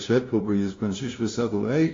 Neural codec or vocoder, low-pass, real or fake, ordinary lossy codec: codec, 16 kHz, 0.5 kbps, FunCodec, trained on LibriTTS, 25 frames a second; 7.2 kHz; fake; AAC, 32 kbps